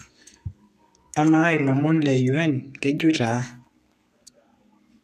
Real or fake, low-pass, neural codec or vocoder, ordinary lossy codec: fake; 14.4 kHz; codec, 32 kHz, 1.9 kbps, SNAC; none